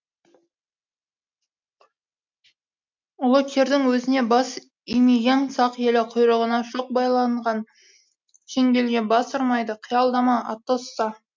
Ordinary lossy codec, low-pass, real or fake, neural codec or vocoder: MP3, 64 kbps; 7.2 kHz; real; none